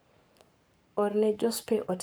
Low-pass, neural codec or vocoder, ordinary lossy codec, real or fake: none; codec, 44.1 kHz, 7.8 kbps, Pupu-Codec; none; fake